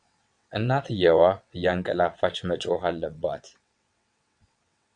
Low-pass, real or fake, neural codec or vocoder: 9.9 kHz; fake; vocoder, 22.05 kHz, 80 mel bands, WaveNeXt